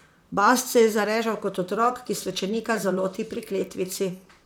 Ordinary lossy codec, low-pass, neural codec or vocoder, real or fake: none; none; vocoder, 44.1 kHz, 128 mel bands, Pupu-Vocoder; fake